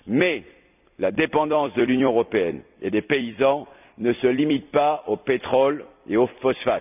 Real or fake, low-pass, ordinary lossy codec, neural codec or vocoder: fake; 3.6 kHz; none; vocoder, 44.1 kHz, 128 mel bands every 256 samples, BigVGAN v2